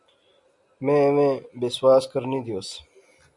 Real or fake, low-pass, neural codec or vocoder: real; 10.8 kHz; none